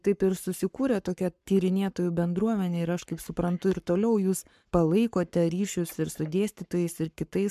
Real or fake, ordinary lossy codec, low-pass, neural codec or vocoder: fake; MP3, 96 kbps; 14.4 kHz; codec, 44.1 kHz, 7.8 kbps, Pupu-Codec